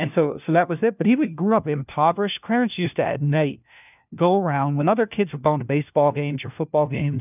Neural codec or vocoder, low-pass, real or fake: codec, 16 kHz, 1 kbps, FunCodec, trained on LibriTTS, 50 frames a second; 3.6 kHz; fake